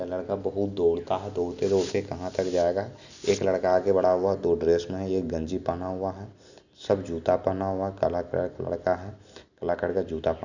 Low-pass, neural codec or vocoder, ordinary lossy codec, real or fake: 7.2 kHz; none; none; real